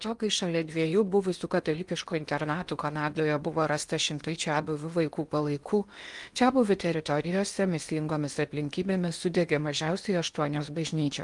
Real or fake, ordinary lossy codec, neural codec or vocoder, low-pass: fake; Opus, 32 kbps; codec, 16 kHz in and 24 kHz out, 0.8 kbps, FocalCodec, streaming, 65536 codes; 10.8 kHz